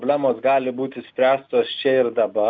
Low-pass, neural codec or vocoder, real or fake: 7.2 kHz; none; real